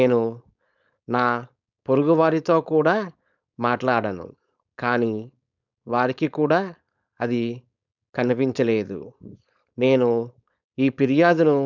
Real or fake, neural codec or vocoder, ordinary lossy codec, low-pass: fake; codec, 16 kHz, 4.8 kbps, FACodec; none; 7.2 kHz